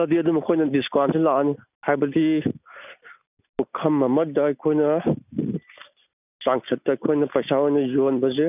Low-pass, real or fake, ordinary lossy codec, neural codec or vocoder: 3.6 kHz; real; none; none